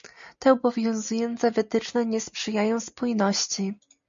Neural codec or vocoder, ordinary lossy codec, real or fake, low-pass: none; MP3, 64 kbps; real; 7.2 kHz